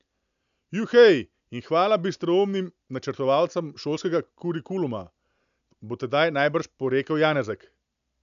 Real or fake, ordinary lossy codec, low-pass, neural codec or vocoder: real; none; 7.2 kHz; none